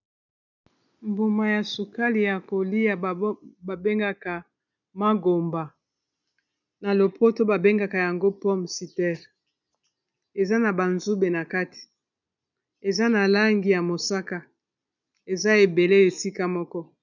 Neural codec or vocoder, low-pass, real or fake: none; 7.2 kHz; real